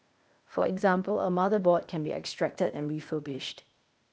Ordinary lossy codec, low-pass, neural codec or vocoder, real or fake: none; none; codec, 16 kHz, 0.8 kbps, ZipCodec; fake